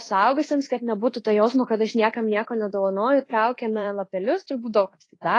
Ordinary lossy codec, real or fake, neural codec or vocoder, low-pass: AAC, 32 kbps; fake; codec, 24 kHz, 1.2 kbps, DualCodec; 10.8 kHz